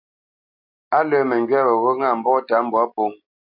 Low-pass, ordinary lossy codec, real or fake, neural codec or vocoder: 5.4 kHz; AAC, 48 kbps; real; none